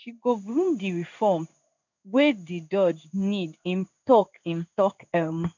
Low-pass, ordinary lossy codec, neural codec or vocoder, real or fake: 7.2 kHz; none; codec, 16 kHz in and 24 kHz out, 1 kbps, XY-Tokenizer; fake